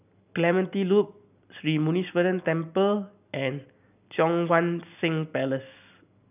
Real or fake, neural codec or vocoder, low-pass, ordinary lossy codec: real; none; 3.6 kHz; none